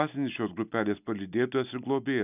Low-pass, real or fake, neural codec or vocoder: 3.6 kHz; real; none